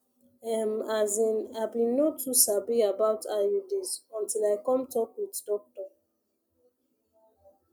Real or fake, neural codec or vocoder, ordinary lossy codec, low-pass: real; none; none; none